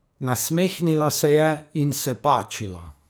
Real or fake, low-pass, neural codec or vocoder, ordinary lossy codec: fake; none; codec, 44.1 kHz, 2.6 kbps, SNAC; none